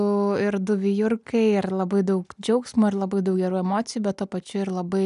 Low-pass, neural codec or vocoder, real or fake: 10.8 kHz; none; real